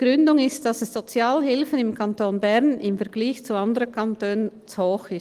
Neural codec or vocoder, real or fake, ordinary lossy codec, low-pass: none; real; Opus, 24 kbps; 14.4 kHz